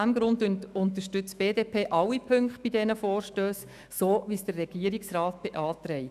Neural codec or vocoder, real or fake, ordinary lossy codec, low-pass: autoencoder, 48 kHz, 128 numbers a frame, DAC-VAE, trained on Japanese speech; fake; none; 14.4 kHz